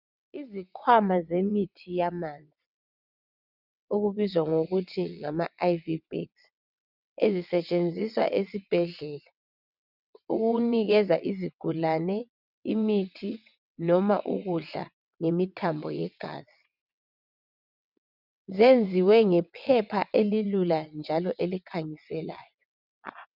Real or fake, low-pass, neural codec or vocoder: fake; 5.4 kHz; vocoder, 22.05 kHz, 80 mel bands, WaveNeXt